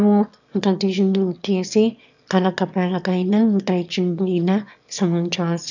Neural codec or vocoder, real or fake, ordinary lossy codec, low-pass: autoencoder, 22.05 kHz, a latent of 192 numbers a frame, VITS, trained on one speaker; fake; none; 7.2 kHz